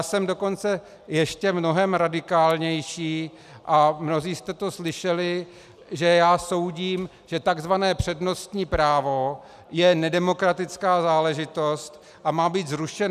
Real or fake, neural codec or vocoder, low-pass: real; none; 14.4 kHz